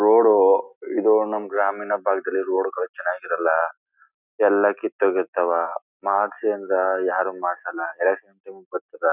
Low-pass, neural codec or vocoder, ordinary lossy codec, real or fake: 3.6 kHz; none; none; real